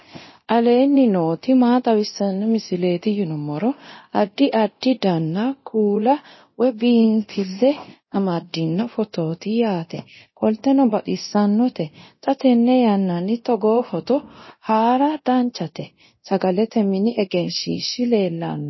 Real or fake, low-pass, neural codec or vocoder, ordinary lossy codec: fake; 7.2 kHz; codec, 24 kHz, 0.9 kbps, DualCodec; MP3, 24 kbps